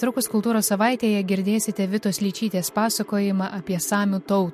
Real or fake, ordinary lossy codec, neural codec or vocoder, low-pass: real; MP3, 64 kbps; none; 14.4 kHz